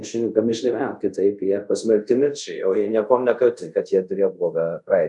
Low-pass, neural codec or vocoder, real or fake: 10.8 kHz; codec, 24 kHz, 0.5 kbps, DualCodec; fake